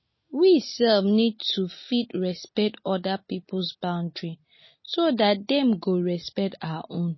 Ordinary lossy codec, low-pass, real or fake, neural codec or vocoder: MP3, 24 kbps; 7.2 kHz; real; none